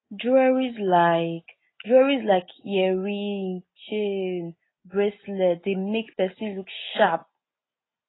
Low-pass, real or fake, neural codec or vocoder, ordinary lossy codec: 7.2 kHz; real; none; AAC, 16 kbps